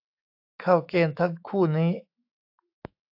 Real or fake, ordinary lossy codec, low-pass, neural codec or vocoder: fake; AAC, 48 kbps; 5.4 kHz; autoencoder, 48 kHz, 128 numbers a frame, DAC-VAE, trained on Japanese speech